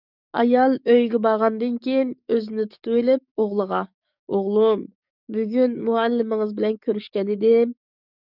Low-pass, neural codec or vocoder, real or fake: 5.4 kHz; codec, 44.1 kHz, 7.8 kbps, DAC; fake